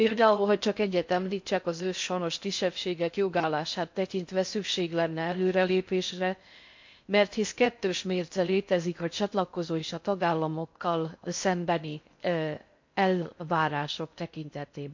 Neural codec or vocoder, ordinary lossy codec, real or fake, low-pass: codec, 16 kHz in and 24 kHz out, 0.6 kbps, FocalCodec, streaming, 4096 codes; MP3, 48 kbps; fake; 7.2 kHz